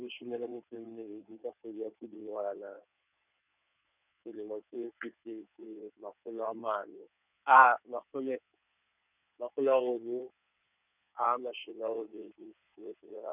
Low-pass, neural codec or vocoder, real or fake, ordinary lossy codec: 3.6 kHz; codec, 16 kHz in and 24 kHz out, 2.2 kbps, FireRedTTS-2 codec; fake; none